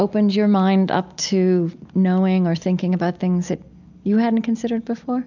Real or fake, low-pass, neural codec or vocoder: real; 7.2 kHz; none